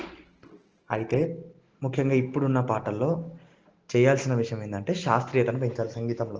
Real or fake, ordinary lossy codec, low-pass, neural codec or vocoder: real; Opus, 16 kbps; 7.2 kHz; none